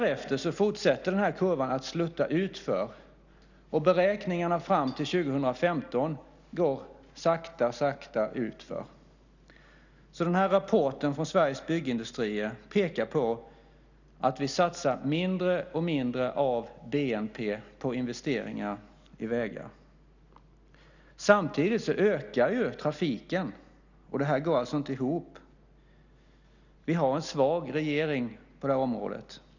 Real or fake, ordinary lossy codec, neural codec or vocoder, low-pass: real; none; none; 7.2 kHz